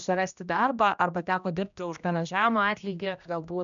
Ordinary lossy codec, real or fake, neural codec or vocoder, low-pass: MP3, 96 kbps; fake; codec, 16 kHz, 1 kbps, X-Codec, HuBERT features, trained on general audio; 7.2 kHz